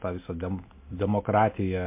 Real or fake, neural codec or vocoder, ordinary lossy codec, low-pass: real; none; MP3, 24 kbps; 3.6 kHz